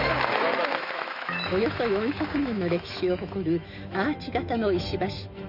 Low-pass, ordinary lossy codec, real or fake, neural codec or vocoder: 5.4 kHz; none; fake; autoencoder, 48 kHz, 128 numbers a frame, DAC-VAE, trained on Japanese speech